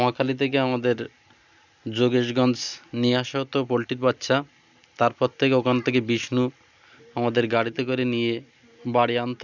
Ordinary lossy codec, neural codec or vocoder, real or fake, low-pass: none; none; real; 7.2 kHz